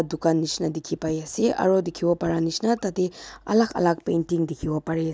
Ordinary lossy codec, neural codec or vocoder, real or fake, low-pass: none; none; real; none